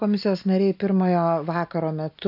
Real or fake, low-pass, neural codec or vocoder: real; 5.4 kHz; none